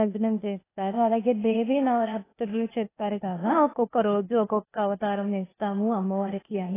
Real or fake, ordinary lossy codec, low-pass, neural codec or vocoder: fake; AAC, 16 kbps; 3.6 kHz; codec, 16 kHz, 0.8 kbps, ZipCodec